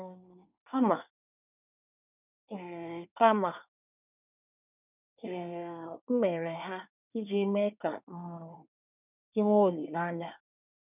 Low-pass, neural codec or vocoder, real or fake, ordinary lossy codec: 3.6 kHz; codec, 24 kHz, 1 kbps, SNAC; fake; none